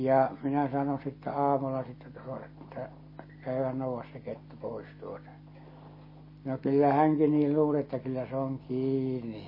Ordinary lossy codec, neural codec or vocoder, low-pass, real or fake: MP3, 24 kbps; none; 5.4 kHz; real